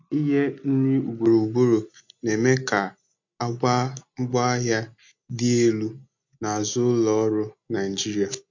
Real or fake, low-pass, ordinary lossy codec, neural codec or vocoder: real; 7.2 kHz; MP3, 48 kbps; none